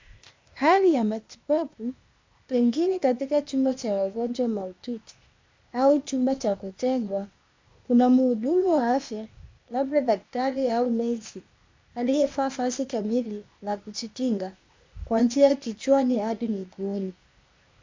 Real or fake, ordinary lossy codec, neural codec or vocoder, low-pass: fake; MP3, 64 kbps; codec, 16 kHz, 0.8 kbps, ZipCodec; 7.2 kHz